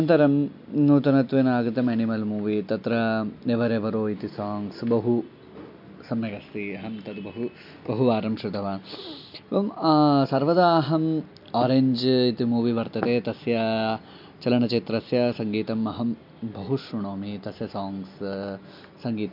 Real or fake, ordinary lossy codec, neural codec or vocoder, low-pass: real; MP3, 48 kbps; none; 5.4 kHz